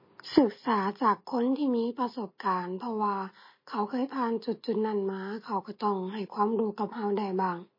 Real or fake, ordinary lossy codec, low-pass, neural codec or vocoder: real; MP3, 24 kbps; 5.4 kHz; none